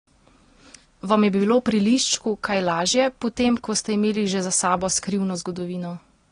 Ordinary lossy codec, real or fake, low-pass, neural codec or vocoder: AAC, 32 kbps; real; 9.9 kHz; none